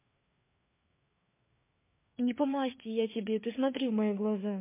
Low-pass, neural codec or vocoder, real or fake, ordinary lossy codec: 3.6 kHz; codec, 16 kHz, 4 kbps, FreqCodec, larger model; fake; MP3, 24 kbps